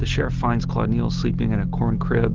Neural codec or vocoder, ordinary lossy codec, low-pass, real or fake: none; Opus, 16 kbps; 7.2 kHz; real